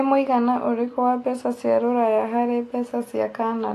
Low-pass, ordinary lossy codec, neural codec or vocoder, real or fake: 14.4 kHz; AAC, 48 kbps; none; real